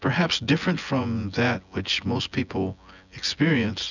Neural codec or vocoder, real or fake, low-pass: vocoder, 24 kHz, 100 mel bands, Vocos; fake; 7.2 kHz